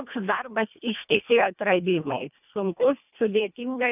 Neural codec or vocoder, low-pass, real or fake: codec, 24 kHz, 1.5 kbps, HILCodec; 3.6 kHz; fake